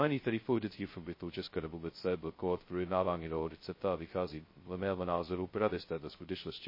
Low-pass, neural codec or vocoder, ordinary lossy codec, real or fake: 5.4 kHz; codec, 16 kHz, 0.2 kbps, FocalCodec; MP3, 24 kbps; fake